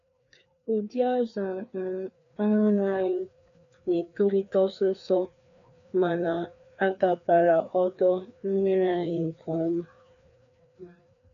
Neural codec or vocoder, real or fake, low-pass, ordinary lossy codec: codec, 16 kHz, 2 kbps, FreqCodec, larger model; fake; 7.2 kHz; none